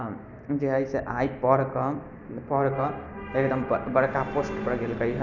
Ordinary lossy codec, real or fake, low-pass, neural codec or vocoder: none; real; 7.2 kHz; none